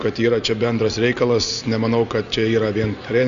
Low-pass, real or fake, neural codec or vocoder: 7.2 kHz; real; none